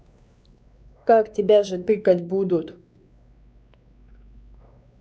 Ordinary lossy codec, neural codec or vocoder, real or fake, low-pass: none; codec, 16 kHz, 2 kbps, X-Codec, WavLM features, trained on Multilingual LibriSpeech; fake; none